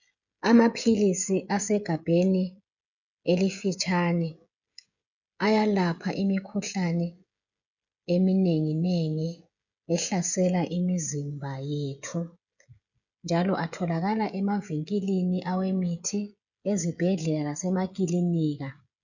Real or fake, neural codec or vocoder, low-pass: fake; codec, 16 kHz, 16 kbps, FreqCodec, smaller model; 7.2 kHz